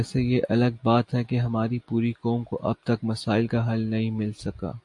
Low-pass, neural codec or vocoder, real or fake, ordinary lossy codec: 10.8 kHz; none; real; AAC, 64 kbps